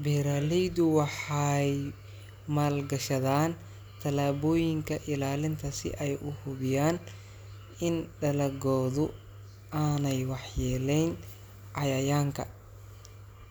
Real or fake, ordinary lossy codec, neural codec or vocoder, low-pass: real; none; none; none